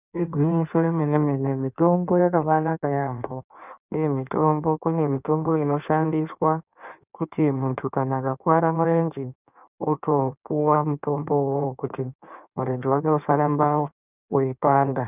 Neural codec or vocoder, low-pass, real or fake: codec, 16 kHz in and 24 kHz out, 1.1 kbps, FireRedTTS-2 codec; 3.6 kHz; fake